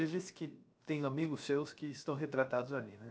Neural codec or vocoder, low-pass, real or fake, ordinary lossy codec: codec, 16 kHz, 0.7 kbps, FocalCodec; none; fake; none